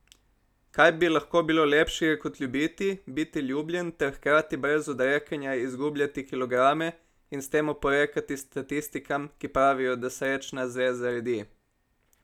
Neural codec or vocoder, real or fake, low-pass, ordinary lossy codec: none; real; 19.8 kHz; none